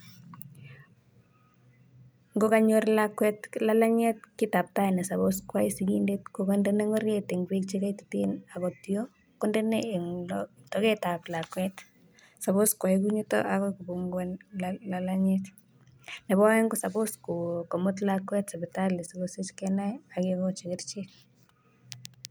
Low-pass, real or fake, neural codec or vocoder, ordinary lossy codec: none; real; none; none